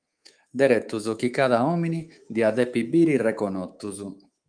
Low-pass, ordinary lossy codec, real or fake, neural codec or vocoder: 9.9 kHz; Opus, 32 kbps; fake; codec, 24 kHz, 3.1 kbps, DualCodec